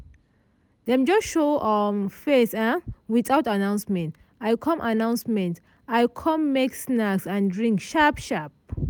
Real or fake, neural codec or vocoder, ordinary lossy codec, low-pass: real; none; none; none